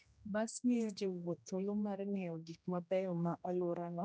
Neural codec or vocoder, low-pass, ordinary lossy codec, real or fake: codec, 16 kHz, 1 kbps, X-Codec, HuBERT features, trained on general audio; none; none; fake